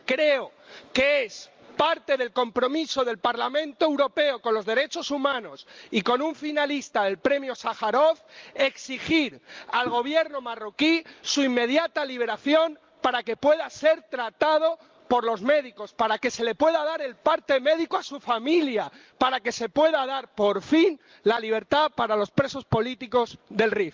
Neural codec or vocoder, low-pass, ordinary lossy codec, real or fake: none; 7.2 kHz; Opus, 32 kbps; real